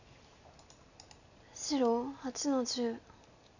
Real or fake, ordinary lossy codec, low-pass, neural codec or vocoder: real; none; 7.2 kHz; none